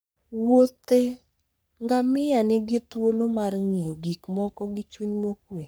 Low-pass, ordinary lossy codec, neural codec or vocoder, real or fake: none; none; codec, 44.1 kHz, 3.4 kbps, Pupu-Codec; fake